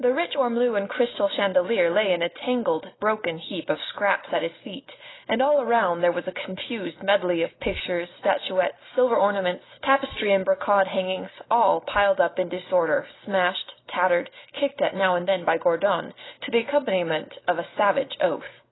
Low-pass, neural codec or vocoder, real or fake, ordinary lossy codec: 7.2 kHz; none; real; AAC, 16 kbps